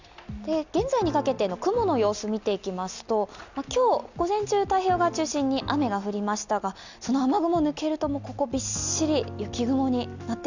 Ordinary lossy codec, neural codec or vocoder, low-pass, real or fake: none; none; 7.2 kHz; real